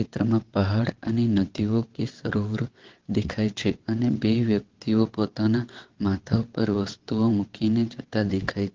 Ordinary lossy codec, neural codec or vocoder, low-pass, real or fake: Opus, 16 kbps; vocoder, 22.05 kHz, 80 mel bands, WaveNeXt; 7.2 kHz; fake